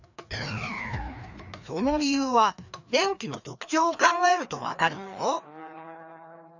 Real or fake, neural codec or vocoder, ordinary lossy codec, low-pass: fake; codec, 16 kHz, 2 kbps, FreqCodec, larger model; none; 7.2 kHz